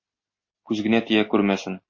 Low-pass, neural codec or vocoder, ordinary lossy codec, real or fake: 7.2 kHz; none; MP3, 32 kbps; real